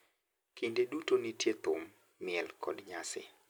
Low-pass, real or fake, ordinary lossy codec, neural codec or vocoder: none; real; none; none